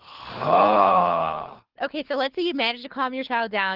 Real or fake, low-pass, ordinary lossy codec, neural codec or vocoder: fake; 5.4 kHz; Opus, 16 kbps; codec, 24 kHz, 6 kbps, HILCodec